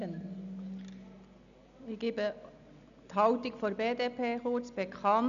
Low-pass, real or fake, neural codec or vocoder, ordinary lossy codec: 7.2 kHz; real; none; none